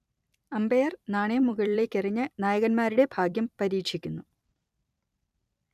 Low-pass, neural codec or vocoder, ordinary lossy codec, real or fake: 14.4 kHz; vocoder, 44.1 kHz, 128 mel bands every 256 samples, BigVGAN v2; none; fake